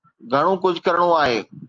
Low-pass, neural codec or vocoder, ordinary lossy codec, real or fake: 7.2 kHz; none; Opus, 32 kbps; real